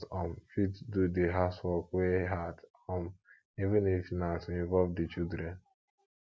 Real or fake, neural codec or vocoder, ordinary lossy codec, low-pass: real; none; none; none